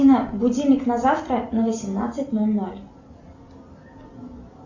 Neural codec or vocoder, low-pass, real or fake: none; 7.2 kHz; real